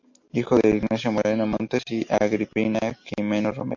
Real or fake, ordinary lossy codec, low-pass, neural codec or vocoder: real; MP3, 48 kbps; 7.2 kHz; none